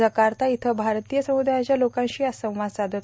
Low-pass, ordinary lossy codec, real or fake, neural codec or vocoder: none; none; real; none